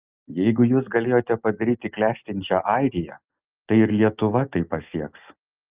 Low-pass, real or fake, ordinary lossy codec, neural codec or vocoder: 3.6 kHz; real; Opus, 24 kbps; none